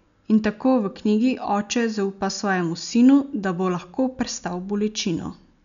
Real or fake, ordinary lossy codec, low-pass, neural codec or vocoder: real; none; 7.2 kHz; none